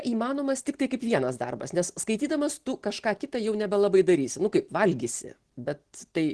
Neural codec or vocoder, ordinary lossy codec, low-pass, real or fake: none; Opus, 16 kbps; 10.8 kHz; real